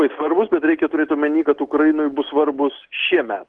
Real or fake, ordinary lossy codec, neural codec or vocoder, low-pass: real; Opus, 16 kbps; none; 9.9 kHz